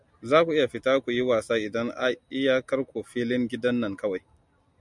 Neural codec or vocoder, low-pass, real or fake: none; 10.8 kHz; real